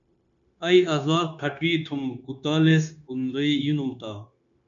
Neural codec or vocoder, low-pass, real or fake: codec, 16 kHz, 0.9 kbps, LongCat-Audio-Codec; 7.2 kHz; fake